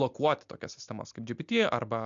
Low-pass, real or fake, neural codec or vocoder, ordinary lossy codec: 7.2 kHz; real; none; MP3, 64 kbps